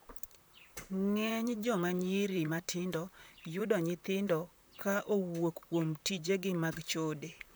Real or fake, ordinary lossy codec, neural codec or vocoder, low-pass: fake; none; vocoder, 44.1 kHz, 128 mel bands, Pupu-Vocoder; none